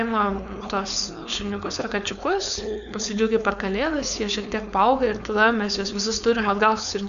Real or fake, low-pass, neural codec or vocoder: fake; 7.2 kHz; codec, 16 kHz, 4.8 kbps, FACodec